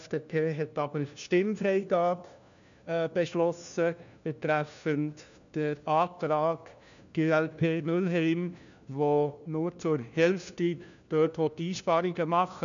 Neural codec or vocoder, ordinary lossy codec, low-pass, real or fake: codec, 16 kHz, 1 kbps, FunCodec, trained on LibriTTS, 50 frames a second; MP3, 96 kbps; 7.2 kHz; fake